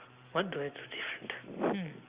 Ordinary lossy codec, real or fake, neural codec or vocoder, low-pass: Opus, 64 kbps; real; none; 3.6 kHz